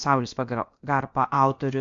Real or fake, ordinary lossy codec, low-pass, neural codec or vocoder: fake; AAC, 48 kbps; 7.2 kHz; codec, 16 kHz, about 1 kbps, DyCAST, with the encoder's durations